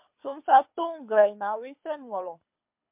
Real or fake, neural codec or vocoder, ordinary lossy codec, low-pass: fake; codec, 24 kHz, 6 kbps, HILCodec; MP3, 32 kbps; 3.6 kHz